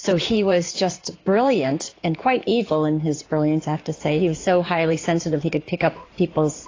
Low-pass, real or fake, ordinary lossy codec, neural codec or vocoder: 7.2 kHz; fake; AAC, 32 kbps; codec, 16 kHz in and 24 kHz out, 2.2 kbps, FireRedTTS-2 codec